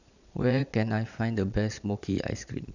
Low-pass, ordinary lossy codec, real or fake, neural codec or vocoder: 7.2 kHz; none; fake; vocoder, 22.05 kHz, 80 mel bands, Vocos